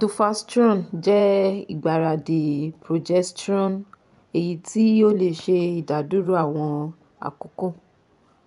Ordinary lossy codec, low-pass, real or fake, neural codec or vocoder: none; 10.8 kHz; fake; vocoder, 24 kHz, 100 mel bands, Vocos